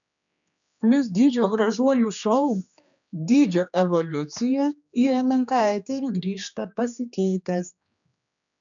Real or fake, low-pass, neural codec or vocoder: fake; 7.2 kHz; codec, 16 kHz, 2 kbps, X-Codec, HuBERT features, trained on general audio